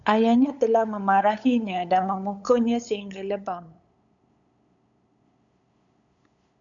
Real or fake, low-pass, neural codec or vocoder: fake; 7.2 kHz; codec, 16 kHz, 8 kbps, FunCodec, trained on LibriTTS, 25 frames a second